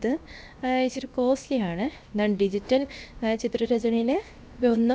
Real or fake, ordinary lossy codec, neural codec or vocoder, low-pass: fake; none; codec, 16 kHz, 0.7 kbps, FocalCodec; none